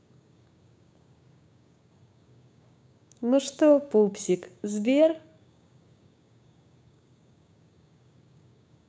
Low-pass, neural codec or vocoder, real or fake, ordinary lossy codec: none; codec, 16 kHz, 6 kbps, DAC; fake; none